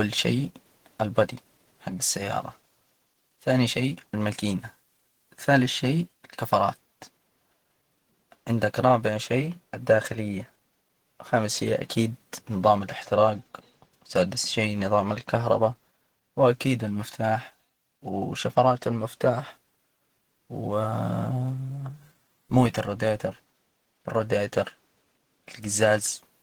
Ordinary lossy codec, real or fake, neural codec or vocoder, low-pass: Opus, 16 kbps; fake; vocoder, 48 kHz, 128 mel bands, Vocos; 19.8 kHz